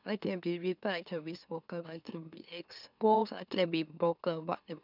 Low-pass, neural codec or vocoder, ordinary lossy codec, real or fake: 5.4 kHz; autoencoder, 44.1 kHz, a latent of 192 numbers a frame, MeloTTS; none; fake